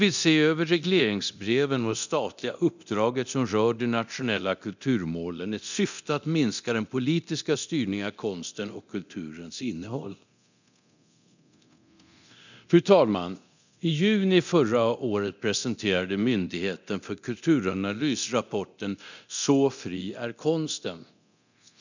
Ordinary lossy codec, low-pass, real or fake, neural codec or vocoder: none; 7.2 kHz; fake; codec, 24 kHz, 0.9 kbps, DualCodec